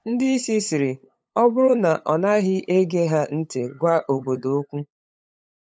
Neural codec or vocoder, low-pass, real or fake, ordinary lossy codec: codec, 16 kHz, 8 kbps, FunCodec, trained on LibriTTS, 25 frames a second; none; fake; none